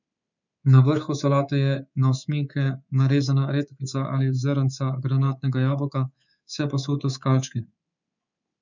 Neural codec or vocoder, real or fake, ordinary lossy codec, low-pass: codec, 24 kHz, 3.1 kbps, DualCodec; fake; none; 7.2 kHz